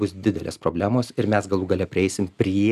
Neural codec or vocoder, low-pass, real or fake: none; 14.4 kHz; real